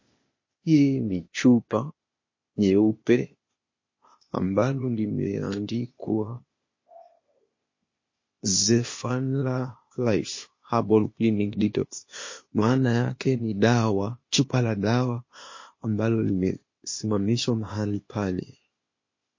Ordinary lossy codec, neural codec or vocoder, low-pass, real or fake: MP3, 32 kbps; codec, 16 kHz, 0.8 kbps, ZipCodec; 7.2 kHz; fake